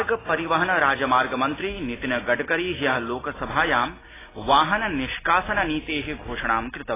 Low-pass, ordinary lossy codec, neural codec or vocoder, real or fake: 3.6 kHz; AAC, 16 kbps; none; real